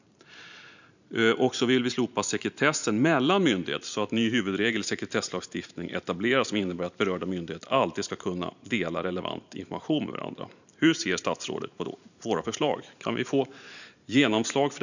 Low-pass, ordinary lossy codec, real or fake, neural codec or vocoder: 7.2 kHz; none; fake; vocoder, 44.1 kHz, 128 mel bands every 512 samples, BigVGAN v2